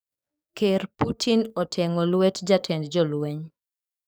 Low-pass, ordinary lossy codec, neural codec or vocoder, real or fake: none; none; codec, 44.1 kHz, 7.8 kbps, DAC; fake